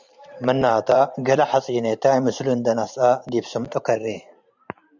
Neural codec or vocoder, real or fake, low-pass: vocoder, 44.1 kHz, 128 mel bands every 512 samples, BigVGAN v2; fake; 7.2 kHz